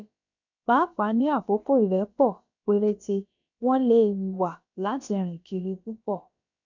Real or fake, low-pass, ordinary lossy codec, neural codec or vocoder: fake; 7.2 kHz; none; codec, 16 kHz, about 1 kbps, DyCAST, with the encoder's durations